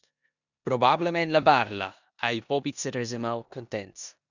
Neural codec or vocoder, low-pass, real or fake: codec, 16 kHz in and 24 kHz out, 0.9 kbps, LongCat-Audio-Codec, four codebook decoder; 7.2 kHz; fake